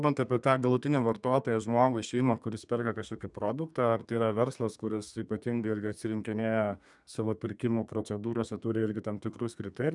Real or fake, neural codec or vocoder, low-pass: fake; codec, 32 kHz, 1.9 kbps, SNAC; 10.8 kHz